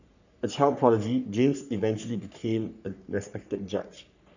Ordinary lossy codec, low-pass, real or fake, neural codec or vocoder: none; 7.2 kHz; fake; codec, 44.1 kHz, 3.4 kbps, Pupu-Codec